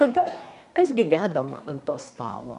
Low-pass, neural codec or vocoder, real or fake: 10.8 kHz; codec, 24 kHz, 1 kbps, SNAC; fake